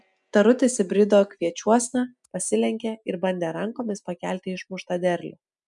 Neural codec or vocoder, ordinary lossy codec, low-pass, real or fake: none; MP3, 96 kbps; 10.8 kHz; real